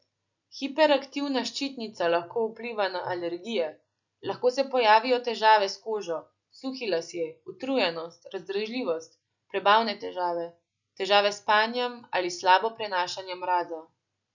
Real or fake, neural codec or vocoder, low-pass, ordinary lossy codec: real; none; 7.2 kHz; none